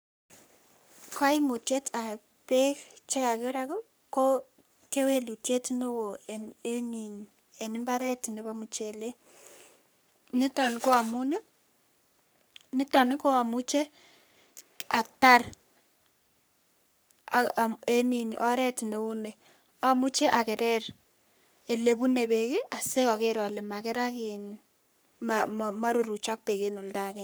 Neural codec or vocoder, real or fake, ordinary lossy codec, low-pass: codec, 44.1 kHz, 3.4 kbps, Pupu-Codec; fake; none; none